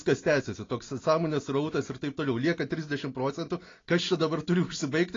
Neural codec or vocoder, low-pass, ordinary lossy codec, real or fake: none; 7.2 kHz; AAC, 32 kbps; real